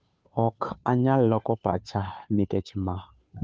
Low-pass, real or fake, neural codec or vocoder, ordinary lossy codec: none; fake; codec, 16 kHz, 2 kbps, FunCodec, trained on Chinese and English, 25 frames a second; none